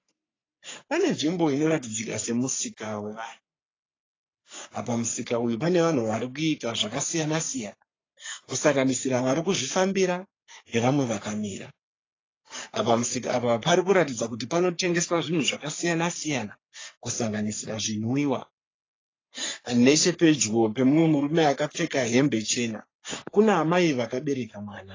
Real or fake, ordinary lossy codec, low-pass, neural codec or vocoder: fake; AAC, 32 kbps; 7.2 kHz; codec, 44.1 kHz, 3.4 kbps, Pupu-Codec